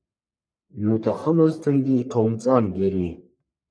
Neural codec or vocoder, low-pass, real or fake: codec, 44.1 kHz, 1.7 kbps, Pupu-Codec; 9.9 kHz; fake